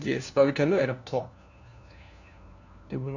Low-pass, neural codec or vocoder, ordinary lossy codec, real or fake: 7.2 kHz; codec, 16 kHz, 1 kbps, FunCodec, trained on LibriTTS, 50 frames a second; none; fake